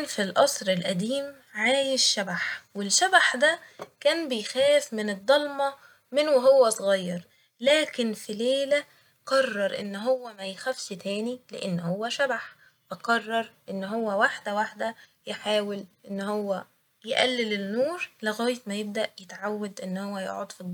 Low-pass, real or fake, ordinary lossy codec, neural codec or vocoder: 19.8 kHz; real; none; none